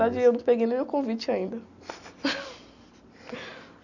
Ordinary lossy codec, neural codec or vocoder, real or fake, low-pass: none; none; real; 7.2 kHz